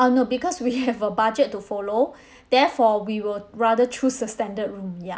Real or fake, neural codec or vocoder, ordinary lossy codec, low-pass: real; none; none; none